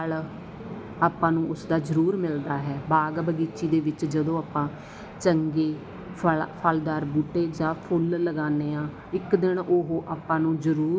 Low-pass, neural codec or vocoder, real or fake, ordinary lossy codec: none; none; real; none